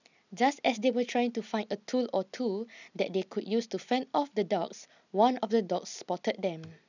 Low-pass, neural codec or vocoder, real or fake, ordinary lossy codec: 7.2 kHz; none; real; none